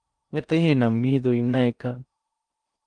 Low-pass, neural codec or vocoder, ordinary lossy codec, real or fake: 9.9 kHz; codec, 16 kHz in and 24 kHz out, 0.6 kbps, FocalCodec, streaming, 4096 codes; Opus, 32 kbps; fake